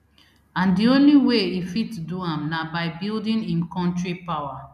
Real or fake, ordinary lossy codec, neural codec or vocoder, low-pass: real; none; none; 14.4 kHz